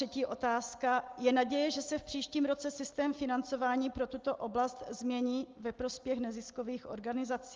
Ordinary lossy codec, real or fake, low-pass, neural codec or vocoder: Opus, 32 kbps; real; 7.2 kHz; none